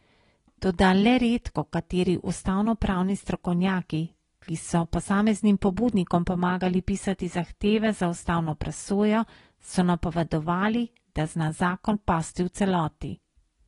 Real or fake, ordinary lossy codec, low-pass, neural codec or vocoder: real; AAC, 32 kbps; 10.8 kHz; none